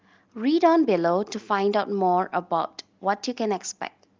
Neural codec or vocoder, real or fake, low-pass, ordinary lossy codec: none; real; 7.2 kHz; Opus, 32 kbps